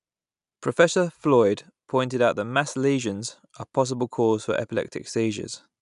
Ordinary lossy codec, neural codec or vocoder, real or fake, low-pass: none; none; real; 10.8 kHz